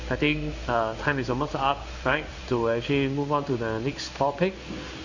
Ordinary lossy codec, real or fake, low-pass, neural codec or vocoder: none; fake; 7.2 kHz; codec, 16 kHz in and 24 kHz out, 1 kbps, XY-Tokenizer